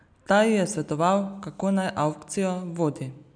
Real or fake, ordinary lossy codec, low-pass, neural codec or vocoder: real; none; 9.9 kHz; none